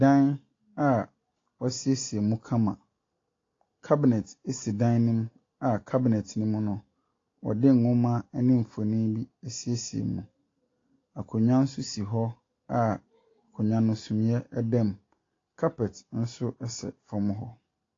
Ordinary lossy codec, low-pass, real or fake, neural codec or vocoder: AAC, 32 kbps; 7.2 kHz; real; none